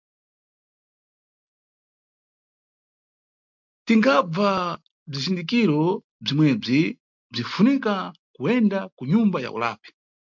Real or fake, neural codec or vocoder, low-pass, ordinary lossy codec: real; none; 7.2 kHz; MP3, 64 kbps